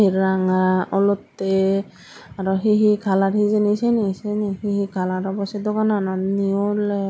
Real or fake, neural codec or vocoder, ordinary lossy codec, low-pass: real; none; none; none